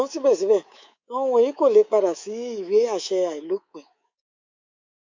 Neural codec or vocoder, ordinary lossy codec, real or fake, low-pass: codec, 24 kHz, 3.1 kbps, DualCodec; MP3, 64 kbps; fake; 7.2 kHz